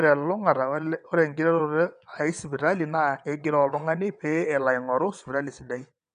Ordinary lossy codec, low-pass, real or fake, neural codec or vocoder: none; 9.9 kHz; fake; vocoder, 22.05 kHz, 80 mel bands, WaveNeXt